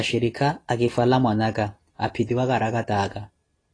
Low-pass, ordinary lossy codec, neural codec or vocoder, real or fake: 9.9 kHz; AAC, 32 kbps; none; real